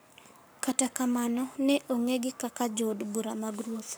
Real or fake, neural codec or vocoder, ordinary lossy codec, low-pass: fake; codec, 44.1 kHz, 7.8 kbps, Pupu-Codec; none; none